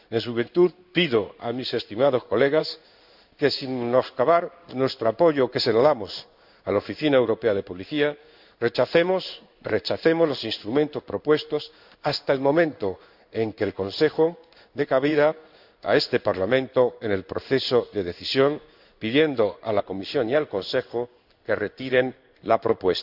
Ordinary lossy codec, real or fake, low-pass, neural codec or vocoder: none; fake; 5.4 kHz; codec, 16 kHz in and 24 kHz out, 1 kbps, XY-Tokenizer